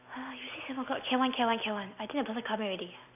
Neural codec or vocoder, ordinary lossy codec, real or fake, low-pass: none; none; real; 3.6 kHz